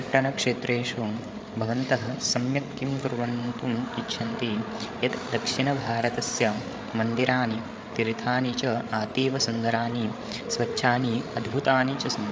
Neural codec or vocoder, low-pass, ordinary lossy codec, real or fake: codec, 16 kHz, 8 kbps, FreqCodec, larger model; none; none; fake